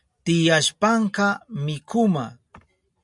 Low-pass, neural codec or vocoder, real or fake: 10.8 kHz; none; real